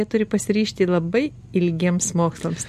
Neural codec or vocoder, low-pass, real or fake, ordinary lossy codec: none; 14.4 kHz; real; MP3, 64 kbps